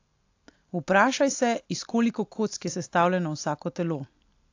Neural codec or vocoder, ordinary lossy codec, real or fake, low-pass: none; AAC, 48 kbps; real; 7.2 kHz